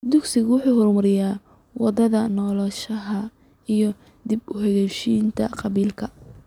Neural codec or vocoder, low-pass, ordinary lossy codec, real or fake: none; 19.8 kHz; none; real